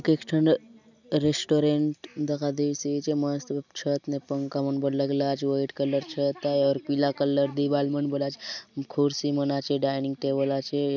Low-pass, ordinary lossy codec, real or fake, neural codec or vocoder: 7.2 kHz; none; real; none